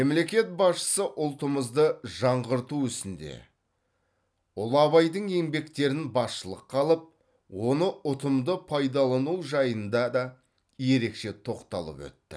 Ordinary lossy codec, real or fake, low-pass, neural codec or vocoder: none; real; none; none